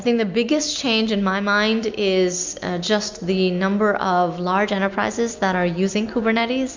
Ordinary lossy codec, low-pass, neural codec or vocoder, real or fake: MP3, 64 kbps; 7.2 kHz; none; real